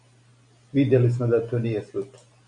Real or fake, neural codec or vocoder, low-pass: real; none; 9.9 kHz